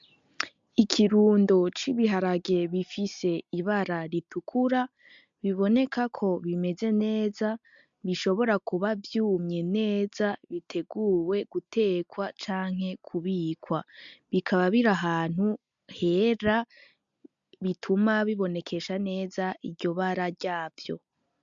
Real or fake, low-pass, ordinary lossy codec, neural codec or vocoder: real; 7.2 kHz; MP3, 64 kbps; none